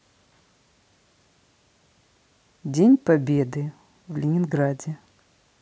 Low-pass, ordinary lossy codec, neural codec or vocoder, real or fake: none; none; none; real